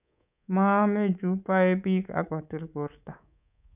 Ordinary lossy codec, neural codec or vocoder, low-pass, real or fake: none; codec, 24 kHz, 3.1 kbps, DualCodec; 3.6 kHz; fake